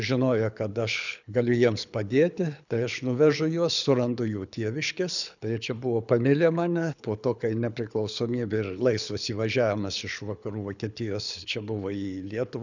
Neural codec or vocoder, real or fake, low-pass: codec, 24 kHz, 6 kbps, HILCodec; fake; 7.2 kHz